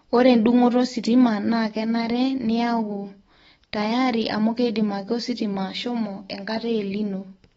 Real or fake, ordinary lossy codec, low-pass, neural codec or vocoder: fake; AAC, 24 kbps; 19.8 kHz; autoencoder, 48 kHz, 128 numbers a frame, DAC-VAE, trained on Japanese speech